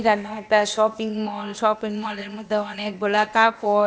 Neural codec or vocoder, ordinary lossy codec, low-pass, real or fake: codec, 16 kHz, 0.8 kbps, ZipCodec; none; none; fake